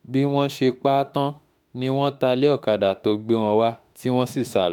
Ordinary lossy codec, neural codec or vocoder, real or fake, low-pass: none; autoencoder, 48 kHz, 32 numbers a frame, DAC-VAE, trained on Japanese speech; fake; 19.8 kHz